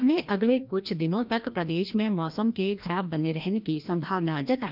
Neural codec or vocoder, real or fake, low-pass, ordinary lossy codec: codec, 16 kHz, 1 kbps, FreqCodec, larger model; fake; 5.4 kHz; none